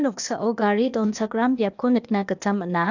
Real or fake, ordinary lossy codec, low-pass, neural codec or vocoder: fake; none; 7.2 kHz; codec, 16 kHz, 0.8 kbps, ZipCodec